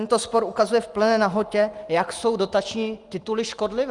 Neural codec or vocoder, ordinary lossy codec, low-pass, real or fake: none; Opus, 32 kbps; 10.8 kHz; real